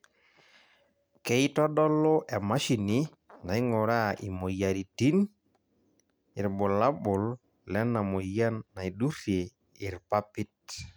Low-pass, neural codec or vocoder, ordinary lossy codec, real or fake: none; none; none; real